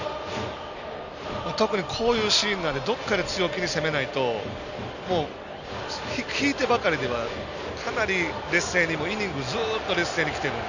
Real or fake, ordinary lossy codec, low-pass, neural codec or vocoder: real; none; 7.2 kHz; none